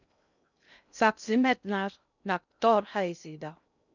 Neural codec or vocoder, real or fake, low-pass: codec, 16 kHz in and 24 kHz out, 0.6 kbps, FocalCodec, streaming, 2048 codes; fake; 7.2 kHz